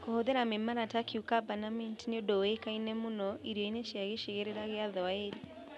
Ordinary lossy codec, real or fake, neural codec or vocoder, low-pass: none; real; none; none